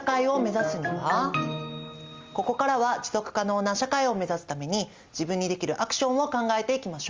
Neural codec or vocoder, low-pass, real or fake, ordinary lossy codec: none; 7.2 kHz; real; Opus, 32 kbps